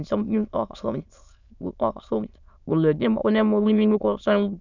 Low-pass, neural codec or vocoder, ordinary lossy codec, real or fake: 7.2 kHz; autoencoder, 22.05 kHz, a latent of 192 numbers a frame, VITS, trained on many speakers; none; fake